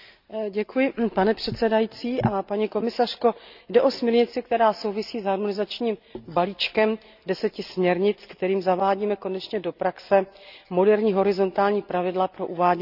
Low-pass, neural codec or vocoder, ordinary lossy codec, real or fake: 5.4 kHz; none; none; real